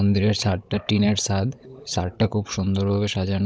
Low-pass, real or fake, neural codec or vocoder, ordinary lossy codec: none; fake; codec, 16 kHz, 16 kbps, FunCodec, trained on Chinese and English, 50 frames a second; none